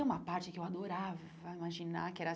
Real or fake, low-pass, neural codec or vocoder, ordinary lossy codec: real; none; none; none